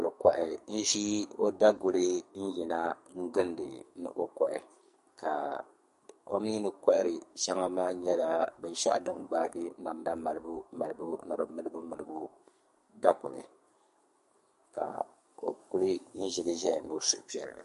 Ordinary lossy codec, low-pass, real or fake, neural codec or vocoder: MP3, 48 kbps; 14.4 kHz; fake; codec, 44.1 kHz, 2.6 kbps, SNAC